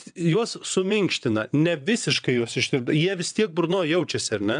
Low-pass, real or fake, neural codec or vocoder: 9.9 kHz; fake; vocoder, 22.05 kHz, 80 mel bands, WaveNeXt